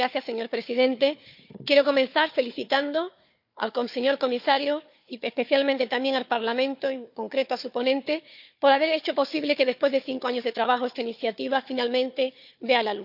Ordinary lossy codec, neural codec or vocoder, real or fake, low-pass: none; codec, 16 kHz, 4 kbps, FunCodec, trained on Chinese and English, 50 frames a second; fake; 5.4 kHz